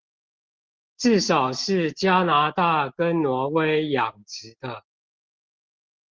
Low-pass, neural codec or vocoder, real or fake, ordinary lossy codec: 7.2 kHz; none; real; Opus, 16 kbps